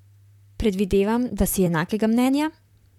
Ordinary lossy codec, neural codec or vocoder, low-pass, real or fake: none; vocoder, 44.1 kHz, 128 mel bands, Pupu-Vocoder; 19.8 kHz; fake